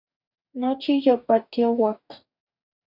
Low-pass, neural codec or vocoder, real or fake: 5.4 kHz; codec, 44.1 kHz, 2.6 kbps, DAC; fake